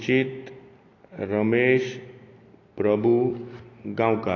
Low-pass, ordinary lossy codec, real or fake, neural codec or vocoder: 7.2 kHz; AAC, 32 kbps; real; none